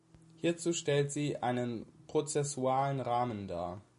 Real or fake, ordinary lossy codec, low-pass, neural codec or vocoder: real; MP3, 48 kbps; 10.8 kHz; none